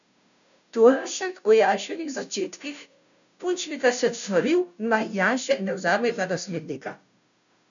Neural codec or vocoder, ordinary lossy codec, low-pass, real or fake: codec, 16 kHz, 0.5 kbps, FunCodec, trained on Chinese and English, 25 frames a second; none; 7.2 kHz; fake